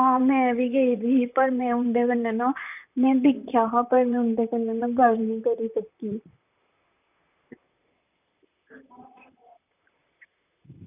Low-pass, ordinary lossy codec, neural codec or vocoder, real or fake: 3.6 kHz; none; vocoder, 44.1 kHz, 128 mel bands, Pupu-Vocoder; fake